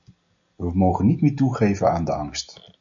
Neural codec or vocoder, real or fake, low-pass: none; real; 7.2 kHz